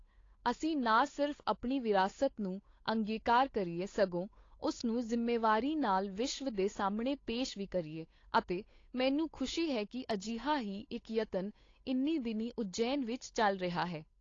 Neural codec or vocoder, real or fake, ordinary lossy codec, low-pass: codec, 16 kHz, 8 kbps, FunCodec, trained on Chinese and English, 25 frames a second; fake; AAC, 32 kbps; 7.2 kHz